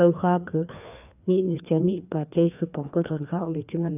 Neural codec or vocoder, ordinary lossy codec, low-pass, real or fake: codec, 16 kHz, 2 kbps, FreqCodec, larger model; none; 3.6 kHz; fake